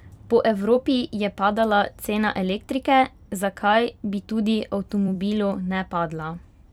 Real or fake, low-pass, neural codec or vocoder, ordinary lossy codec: fake; 19.8 kHz; vocoder, 44.1 kHz, 128 mel bands every 256 samples, BigVGAN v2; none